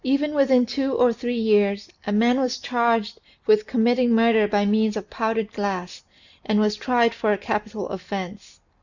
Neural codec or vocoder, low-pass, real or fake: none; 7.2 kHz; real